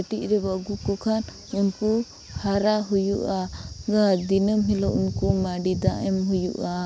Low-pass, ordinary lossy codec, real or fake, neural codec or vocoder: none; none; real; none